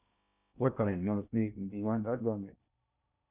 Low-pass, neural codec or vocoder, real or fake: 3.6 kHz; codec, 16 kHz in and 24 kHz out, 0.6 kbps, FocalCodec, streaming, 2048 codes; fake